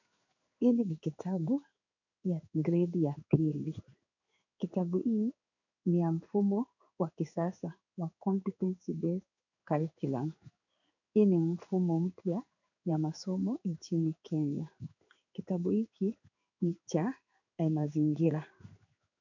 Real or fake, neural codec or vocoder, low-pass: fake; codec, 16 kHz in and 24 kHz out, 1 kbps, XY-Tokenizer; 7.2 kHz